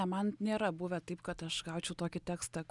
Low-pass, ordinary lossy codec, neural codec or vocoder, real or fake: 10.8 kHz; Opus, 64 kbps; none; real